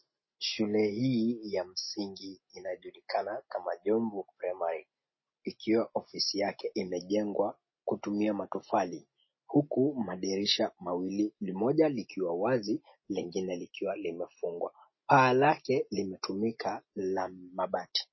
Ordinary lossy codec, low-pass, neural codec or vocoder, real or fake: MP3, 24 kbps; 7.2 kHz; none; real